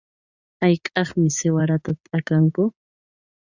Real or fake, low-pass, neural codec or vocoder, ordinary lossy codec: fake; 7.2 kHz; vocoder, 44.1 kHz, 80 mel bands, Vocos; Opus, 64 kbps